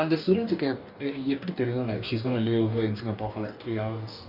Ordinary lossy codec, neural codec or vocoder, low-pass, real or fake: none; codec, 44.1 kHz, 2.6 kbps, DAC; 5.4 kHz; fake